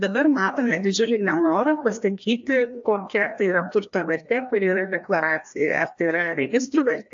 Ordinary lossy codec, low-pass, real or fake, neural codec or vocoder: AAC, 64 kbps; 7.2 kHz; fake; codec, 16 kHz, 1 kbps, FreqCodec, larger model